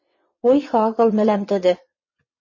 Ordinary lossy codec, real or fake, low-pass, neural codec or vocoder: MP3, 32 kbps; fake; 7.2 kHz; vocoder, 22.05 kHz, 80 mel bands, WaveNeXt